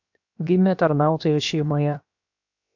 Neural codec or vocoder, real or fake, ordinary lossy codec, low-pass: codec, 16 kHz, 0.7 kbps, FocalCodec; fake; AAC, 48 kbps; 7.2 kHz